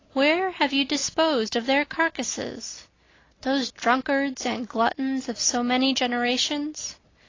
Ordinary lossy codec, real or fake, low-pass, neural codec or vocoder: AAC, 32 kbps; real; 7.2 kHz; none